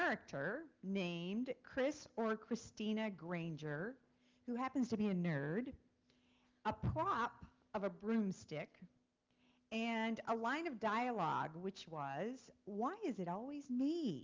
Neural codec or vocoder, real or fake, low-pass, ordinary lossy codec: none; real; 7.2 kHz; Opus, 32 kbps